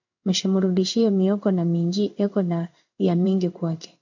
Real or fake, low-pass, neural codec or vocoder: fake; 7.2 kHz; codec, 16 kHz in and 24 kHz out, 1 kbps, XY-Tokenizer